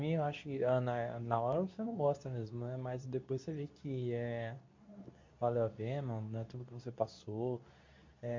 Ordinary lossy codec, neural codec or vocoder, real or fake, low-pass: MP3, 48 kbps; codec, 24 kHz, 0.9 kbps, WavTokenizer, medium speech release version 2; fake; 7.2 kHz